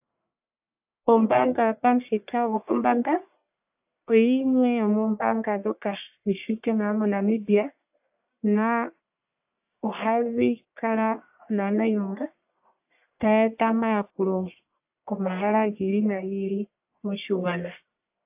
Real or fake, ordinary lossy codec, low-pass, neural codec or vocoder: fake; AAC, 32 kbps; 3.6 kHz; codec, 44.1 kHz, 1.7 kbps, Pupu-Codec